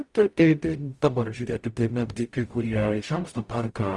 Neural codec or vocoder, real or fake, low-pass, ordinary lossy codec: codec, 44.1 kHz, 0.9 kbps, DAC; fake; 10.8 kHz; Opus, 32 kbps